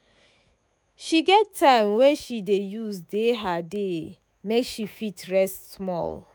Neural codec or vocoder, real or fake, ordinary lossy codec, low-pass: autoencoder, 48 kHz, 128 numbers a frame, DAC-VAE, trained on Japanese speech; fake; none; none